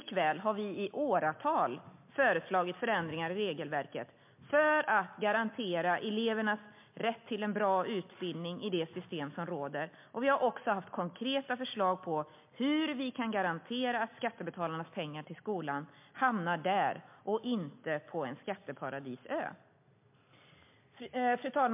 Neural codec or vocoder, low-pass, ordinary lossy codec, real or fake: none; 3.6 kHz; MP3, 32 kbps; real